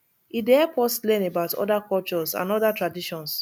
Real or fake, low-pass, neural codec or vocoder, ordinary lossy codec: real; 19.8 kHz; none; none